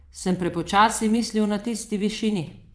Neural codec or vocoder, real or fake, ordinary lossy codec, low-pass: vocoder, 22.05 kHz, 80 mel bands, WaveNeXt; fake; none; none